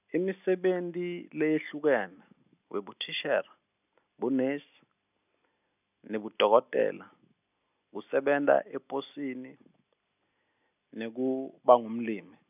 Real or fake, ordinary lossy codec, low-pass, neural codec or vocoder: real; none; 3.6 kHz; none